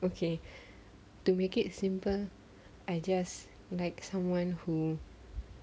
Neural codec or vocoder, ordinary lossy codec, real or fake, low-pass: none; none; real; none